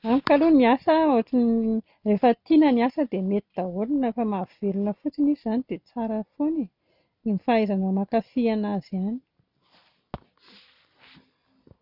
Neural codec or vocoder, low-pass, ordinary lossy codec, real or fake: none; 5.4 kHz; none; real